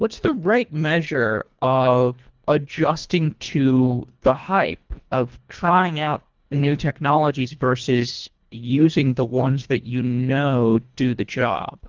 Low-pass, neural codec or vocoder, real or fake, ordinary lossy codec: 7.2 kHz; codec, 24 kHz, 1.5 kbps, HILCodec; fake; Opus, 32 kbps